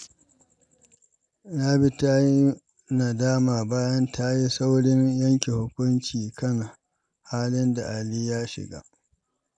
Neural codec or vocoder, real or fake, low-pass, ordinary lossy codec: none; real; 9.9 kHz; none